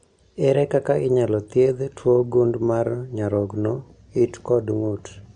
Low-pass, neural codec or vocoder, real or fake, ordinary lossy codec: 9.9 kHz; none; real; MP3, 64 kbps